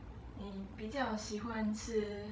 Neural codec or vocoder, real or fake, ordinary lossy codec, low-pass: codec, 16 kHz, 16 kbps, FreqCodec, larger model; fake; none; none